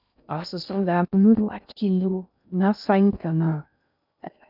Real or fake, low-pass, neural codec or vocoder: fake; 5.4 kHz; codec, 16 kHz in and 24 kHz out, 0.6 kbps, FocalCodec, streaming, 2048 codes